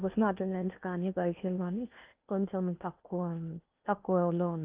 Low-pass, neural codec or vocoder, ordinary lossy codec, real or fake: 3.6 kHz; codec, 16 kHz in and 24 kHz out, 0.8 kbps, FocalCodec, streaming, 65536 codes; Opus, 32 kbps; fake